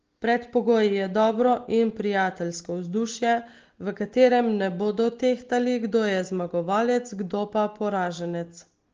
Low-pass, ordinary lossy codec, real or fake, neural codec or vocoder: 7.2 kHz; Opus, 16 kbps; real; none